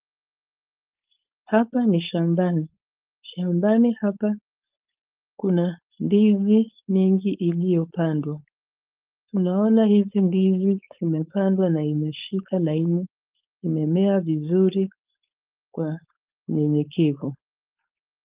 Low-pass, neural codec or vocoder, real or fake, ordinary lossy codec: 3.6 kHz; codec, 16 kHz, 4.8 kbps, FACodec; fake; Opus, 32 kbps